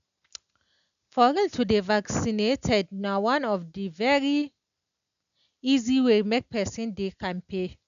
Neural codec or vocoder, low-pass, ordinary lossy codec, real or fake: none; 7.2 kHz; none; real